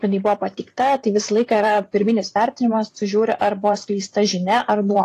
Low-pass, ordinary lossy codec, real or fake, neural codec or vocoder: 14.4 kHz; AAC, 48 kbps; fake; vocoder, 44.1 kHz, 128 mel bands every 512 samples, BigVGAN v2